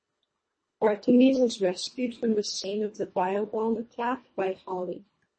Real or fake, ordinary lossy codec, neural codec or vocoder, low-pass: fake; MP3, 32 kbps; codec, 24 kHz, 1.5 kbps, HILCodec; 9.9 kHz